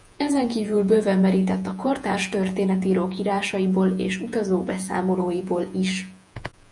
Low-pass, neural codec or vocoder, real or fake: 10.8 kHz; vocoder, 48 kHz, 128 mel bands, Vocos; fake